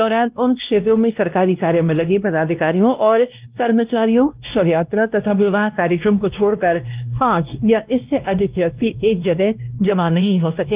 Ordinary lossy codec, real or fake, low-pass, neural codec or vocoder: Opus, 24 kbps; fake; 3.6 kHz; codec, 16 kHz, 1 kbps, X-Codec, WavLM features, trained on Multilingual LibriSpeech